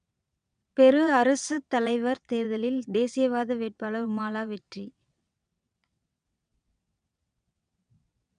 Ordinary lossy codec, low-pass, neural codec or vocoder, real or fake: none; 9.9 kHz; vocoder, 22.05 kHz, 80 mel bands, WaveNeXt; fake